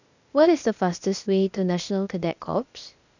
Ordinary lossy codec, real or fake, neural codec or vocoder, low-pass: none; fake; codec, 16 kHz, 0.8 kbps, ZipCodec; 7.2 kHz